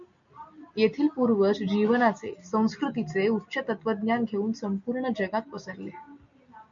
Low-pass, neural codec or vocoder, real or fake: 7.2 kHz; none; real